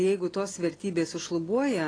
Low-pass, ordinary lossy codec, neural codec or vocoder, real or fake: 9.9 kHz; AAC, 32 kbps; none; real